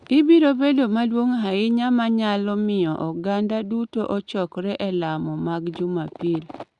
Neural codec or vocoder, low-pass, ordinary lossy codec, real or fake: none; 10.8 kHz; Opus, 64 kbps; real